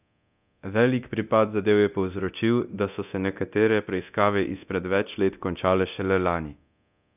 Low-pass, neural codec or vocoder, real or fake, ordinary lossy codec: 3.6 kHz; codec, 24 kHz, 0.9 kbps, DualCodec; fake; none